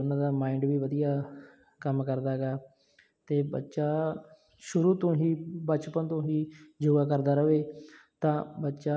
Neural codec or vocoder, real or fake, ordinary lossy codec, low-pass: none; real; none; none